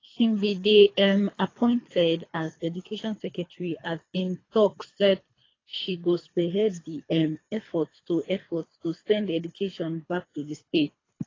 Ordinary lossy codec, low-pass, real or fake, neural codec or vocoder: AAC, 32 kbps; 7.2 kHz; fake; codec, 24 kHz, 3 kbps, HILCodec